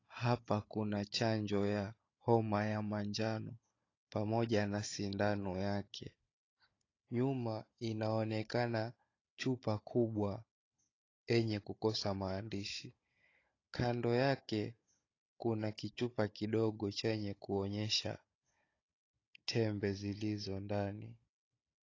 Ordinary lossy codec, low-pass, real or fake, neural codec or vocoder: AAC, 32 kbps; 7.2 kHz; fake; codec, 16 kHz, 16 kbps, FunCodec, trained on Chinese and English, 50 frames a second